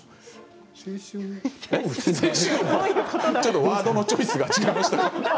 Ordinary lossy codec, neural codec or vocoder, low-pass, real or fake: none; none; none; real